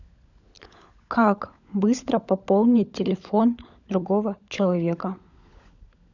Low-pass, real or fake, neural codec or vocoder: 7.2 kHz; fake; codec, 16 kHz, 16 kbps, FunCodec, trained on LibriTTS, 50 frames a second